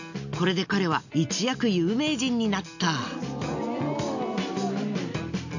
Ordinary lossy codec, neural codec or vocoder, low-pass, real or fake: none; none; 7.2 kHz; real